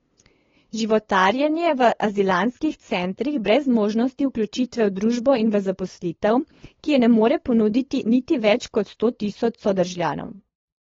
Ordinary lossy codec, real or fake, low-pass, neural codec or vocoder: AAC, 24 kbps; fake; 7.2 kHz; codec, 16 kHz, 8 kbps, FunCodec, trained on LibriTTS, 25 frames a second